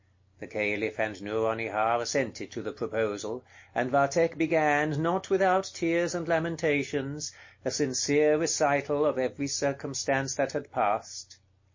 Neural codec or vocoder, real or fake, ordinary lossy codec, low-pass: none; real; MP3, 32 kbps; 7.2 kHz